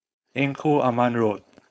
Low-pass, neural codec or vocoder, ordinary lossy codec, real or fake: none; codec, 16 kHz, 4.8 kbps, FACodec; none; fake